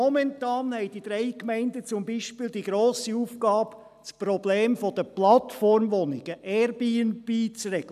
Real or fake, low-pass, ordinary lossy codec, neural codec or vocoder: real; 14.4 kHz; none; none